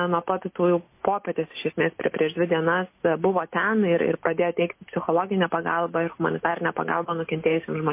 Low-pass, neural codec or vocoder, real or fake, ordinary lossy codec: 3.6 kHz; none; real; MP3, 24 kbps